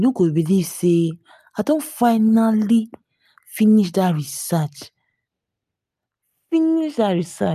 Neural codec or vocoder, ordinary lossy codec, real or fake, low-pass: none; none; real; 14.4 kHz